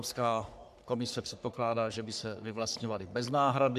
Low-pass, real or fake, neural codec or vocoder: 14.4 kHz; fake; codec, 44.1 kHz, 3.4 kbps, Pupu-Codec